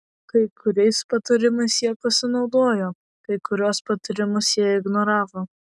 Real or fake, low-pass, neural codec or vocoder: real; 10.8 kHz; none